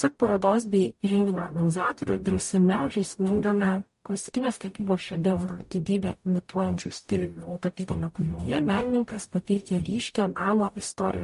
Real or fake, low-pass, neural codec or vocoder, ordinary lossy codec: fake; 14.4 kHz; codec, 44.1 kHz, 0.9 kbps, DAC; MP3, 48 kbps